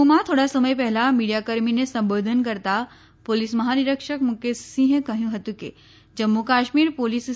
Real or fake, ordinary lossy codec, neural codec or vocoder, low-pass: real; none; none; none